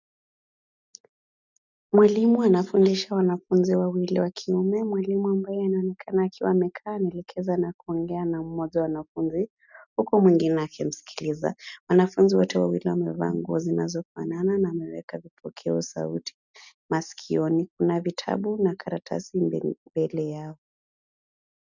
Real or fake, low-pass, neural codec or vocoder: real; 7.2 kHz; none